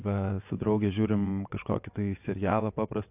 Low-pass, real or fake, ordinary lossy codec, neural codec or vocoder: 3.6 kHz; fake; AAC, 32 kbps; vocoder, 22.05 kHz, 80 mel bands, WaveNeXt